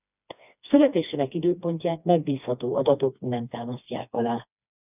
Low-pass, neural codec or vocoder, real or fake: 3.6 kHz; codec, 16 kHz, 2 kbps, FreqCodec, smaller model; fake